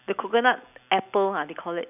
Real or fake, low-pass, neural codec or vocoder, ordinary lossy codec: real; 3.6 kHz; none; none